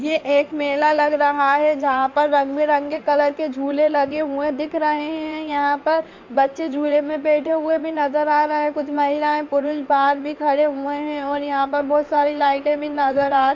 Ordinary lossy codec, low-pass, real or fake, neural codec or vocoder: MP3, 48 kbps; 7.2 kHz; fake; codec, 16 kHz in and 24 kHz out, 2.2 kbps, FireRedTTS-2 codec